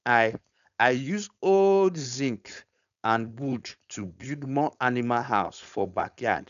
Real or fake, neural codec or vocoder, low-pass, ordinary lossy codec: fake; codec, 16 kHz, 4.8 kbps, FACodec; 7.2 kHz; none